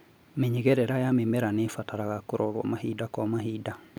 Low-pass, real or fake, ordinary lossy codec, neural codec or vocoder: none; real; none; none